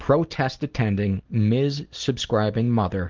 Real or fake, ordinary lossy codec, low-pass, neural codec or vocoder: real; Opus, 16 kbps; 7.2 kHz; none